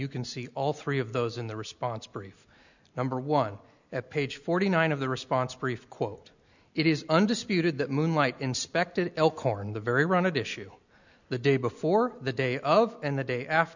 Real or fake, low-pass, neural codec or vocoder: real; 7.2 kHz; none